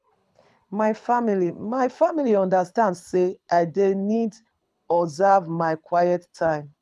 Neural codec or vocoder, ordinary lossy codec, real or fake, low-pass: codec, 24 kHz, 6 kbps, HILCodec; none; fake; none